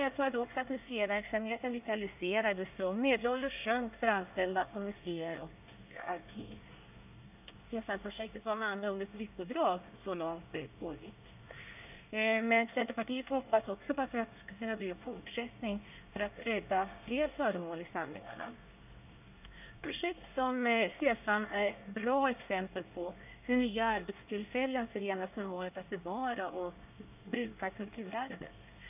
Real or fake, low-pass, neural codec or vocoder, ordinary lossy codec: fake; 3.6 kHz; codec, 24 kHz, 1 kbps, SNAC; none